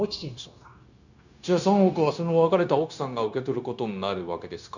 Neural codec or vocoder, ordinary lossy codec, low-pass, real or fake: codec, 16 kHz, 0.9 kbps, LongCat-Audio-Codec; none; 7.2 kHz; fake